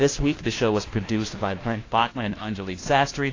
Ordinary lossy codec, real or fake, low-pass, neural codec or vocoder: AAC, 32 kbps; fake; 7.2 kHz; codec, 16 kHz, 1 kbps, FunCodec, trained on LibriTTS, 50 frames a second